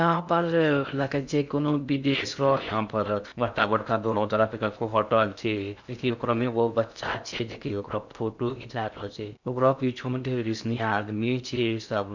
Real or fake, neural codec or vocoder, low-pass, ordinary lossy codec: fake; codec, 16 kHz in and 24 kHz out, 0.6 kbps, FocalCodec, streaming, 2048 codes; 7.2 kHz; none